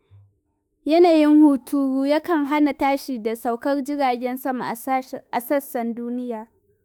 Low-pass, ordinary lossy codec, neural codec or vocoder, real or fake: none; none; autoencoder, 48 kHz, 32 numbers a frame, DAC-VAE, trained on Japanese speech; fake